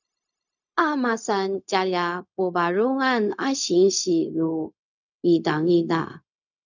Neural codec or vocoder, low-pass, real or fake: codec, 16 kHz, 0.4 kbps, LongCat-Audio-Codec; 7.2 kHz; fake